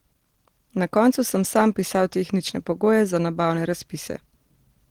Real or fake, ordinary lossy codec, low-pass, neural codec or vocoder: real; Opus, 16 kbps; 19.8 kHz; none